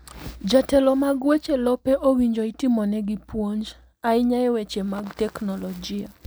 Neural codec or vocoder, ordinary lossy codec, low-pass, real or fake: none; none; none; real